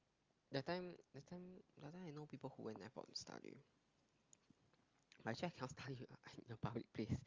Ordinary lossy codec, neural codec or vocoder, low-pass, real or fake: Opus, 32 kbps; none; 7.2 kHz; real